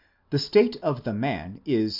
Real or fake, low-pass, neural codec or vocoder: real; 5.4 kHz; none